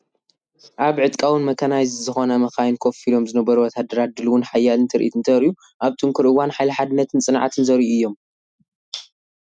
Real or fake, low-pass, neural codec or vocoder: real; 9.9 kHz; none